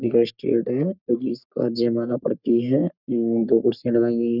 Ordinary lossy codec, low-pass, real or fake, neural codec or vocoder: none; 5.4 kHz; fake; codec, 44.1 kHz, 3.4 kbps, Pupu-Codec